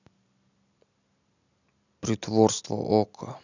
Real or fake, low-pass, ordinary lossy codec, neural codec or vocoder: real; 7.2 kHz; none; none